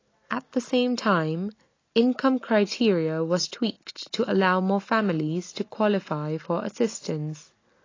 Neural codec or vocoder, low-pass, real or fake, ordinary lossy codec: none; 7.2 kHz; real; AAC, 32 kbps